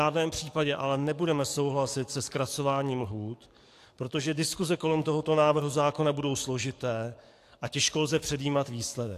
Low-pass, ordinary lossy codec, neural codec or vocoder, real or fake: 14.4 kHz; AAC, 64 kbps; codec, 44.1 kHz, 7.8 kbps, DAC; fake